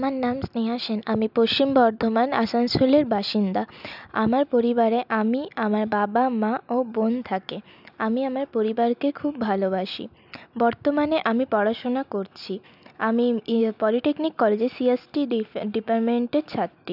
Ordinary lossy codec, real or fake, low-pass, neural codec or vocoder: none; real; 5.4 kHz; none